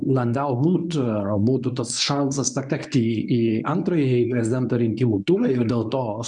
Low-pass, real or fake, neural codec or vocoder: 10.8 kHz; fake; codec, 24 kHz, 0.9 kbps, WavTokenizer, medium speech release version 1